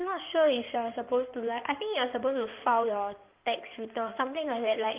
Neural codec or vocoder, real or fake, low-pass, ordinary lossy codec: codec, 16 kHz in and 24 kHz out, 2.2 kbps, FireRedTTS-2 codec; fake; 3.6 kHz; Opus, 24 kbps